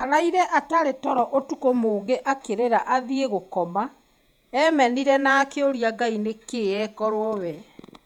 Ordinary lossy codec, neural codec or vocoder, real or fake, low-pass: none; vocoder, 48 kHz, 128 mel bands, Vocos; fake; 19.8 kHz